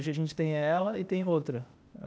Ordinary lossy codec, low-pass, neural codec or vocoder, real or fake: none; none; codec, 16 kHz, 0.8 kbps, ZipCodec; fake